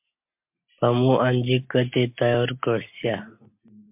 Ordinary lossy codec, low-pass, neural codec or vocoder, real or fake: MP3, 32 kbps; 3.6 kHz; none; real